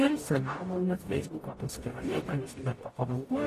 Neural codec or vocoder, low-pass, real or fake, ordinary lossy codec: codec, 44.1 kHz, 0.9 kbps, DAC; 14.4 kHz; fake; AAC, 48 kbps